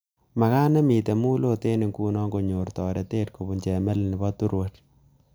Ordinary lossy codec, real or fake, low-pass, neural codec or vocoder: none; real; none; none